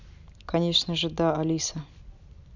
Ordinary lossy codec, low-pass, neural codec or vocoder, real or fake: none; 7.2 kHz; none; real